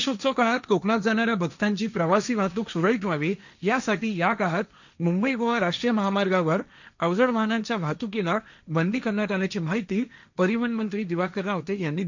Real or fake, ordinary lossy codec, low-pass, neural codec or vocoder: fake; none; 7.2 kHz; codec, 16 kHz, 1.1 kbps, Voila-Tokenizer